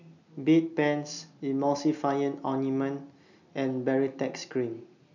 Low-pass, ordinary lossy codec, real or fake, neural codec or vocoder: 7.2 kHz; none; real; none